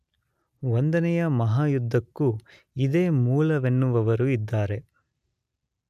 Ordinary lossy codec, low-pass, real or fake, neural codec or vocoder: none; 14.4 kHz; real; none